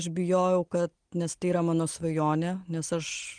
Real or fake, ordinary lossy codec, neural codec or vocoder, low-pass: real; Opus, 24 kbps; none; 9.9 kHz